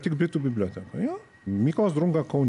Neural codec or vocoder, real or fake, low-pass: none; real; 10.8 kHz